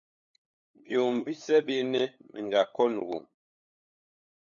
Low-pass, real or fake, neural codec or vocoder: 7.2 kHz; fake; codec, 16 kHz, 8 kbps, FunCodec, trained on LibriTTS, 25 frames a second